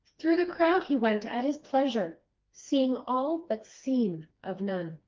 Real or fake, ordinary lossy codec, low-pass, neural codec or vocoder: fake; Opus, 24 kbps; 7.2 kHz; codec, 16 kHz, 2 kbps, FreqCodec, smaller model